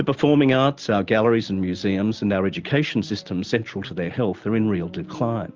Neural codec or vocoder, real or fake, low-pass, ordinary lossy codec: none; real; 7.2 kHz; Opus, 32 kbps